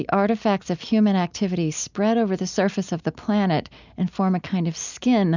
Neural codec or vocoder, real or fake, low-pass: none; real; 7.2 kHz